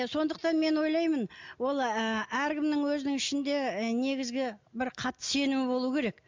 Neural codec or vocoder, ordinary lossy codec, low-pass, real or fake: none; MP3, 64 kbps; 7.2 kHz; real